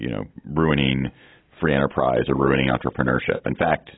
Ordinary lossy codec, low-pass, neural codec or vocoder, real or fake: AAC, 16 kbps; 7.2 kHz; none; real